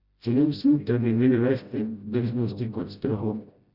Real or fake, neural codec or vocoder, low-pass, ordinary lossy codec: fake; codec, 16 kHz, 0.5 kbps, FreqCodec, smaller model; 5.4 kHz; Opus, 64 kbps